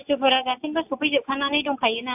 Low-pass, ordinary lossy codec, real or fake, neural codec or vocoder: 3.6 kHz; AAC, 32 kbps; real; none